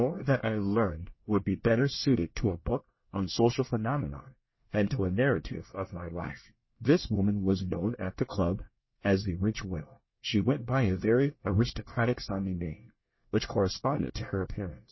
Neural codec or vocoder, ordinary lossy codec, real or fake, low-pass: codec, 24 kHz, 1 kbps, SNAC; MP3, 24 kbps; fake; 7.2 kHz